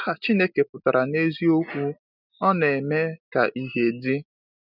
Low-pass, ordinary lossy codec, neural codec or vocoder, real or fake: 5.4 kHz; none; none; real